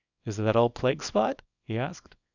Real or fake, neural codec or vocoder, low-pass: fake; codec, 24 kHz, 0.9 kbps, WavTokenizer, small release; 7.2 kHz